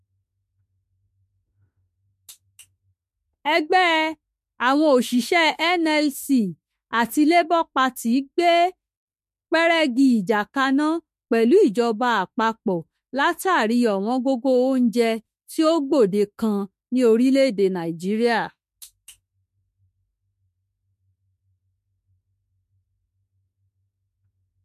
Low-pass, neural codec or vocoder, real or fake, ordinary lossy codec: 14.4 kHz; autoencoder, 48 kHz, 32 numbers a frame, DAC-VAE, trained on Japanese speech; fake; MP3, 64 kbps